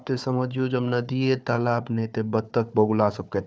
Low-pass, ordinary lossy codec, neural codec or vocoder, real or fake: none; none; codec, 16 kHz, 4 kbps, FunCodec, trained on Chinese and English, 50 frames a second; fake